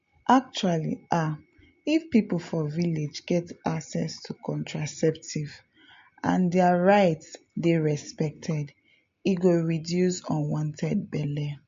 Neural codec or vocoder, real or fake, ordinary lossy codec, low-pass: none; real; MP3, 48 kbps; 7.2 kHz